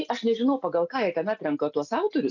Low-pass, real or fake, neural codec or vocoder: 7.2 kHz; real; none